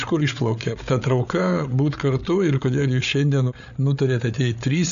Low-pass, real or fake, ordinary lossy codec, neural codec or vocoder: 7.2 kHz; fake; MP3, 96 kbps; codec, 16 kHz, 8 kbps, FreqCodec, larger model